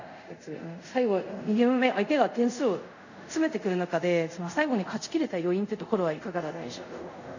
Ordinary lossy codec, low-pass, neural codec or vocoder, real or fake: none; 7.2 kHz; codec, 24 kHz, 0.5 kbps, DualCodec; fake